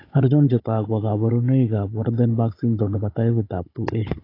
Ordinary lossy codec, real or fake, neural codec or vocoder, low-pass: AAC, 32 kbps; fake; codec, 16 kHz, 16 kbps, FunCodec, trained on Chinese and English, 50 frames a second; 5.4 kHz